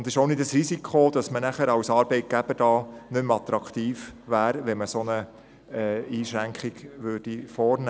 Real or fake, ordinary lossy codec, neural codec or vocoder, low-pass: real; none; none; none